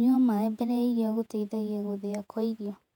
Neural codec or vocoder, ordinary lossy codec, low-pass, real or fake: vocoder, 48 kHz, 128 mel bands, Vocos; none; 19.8 kHz; fake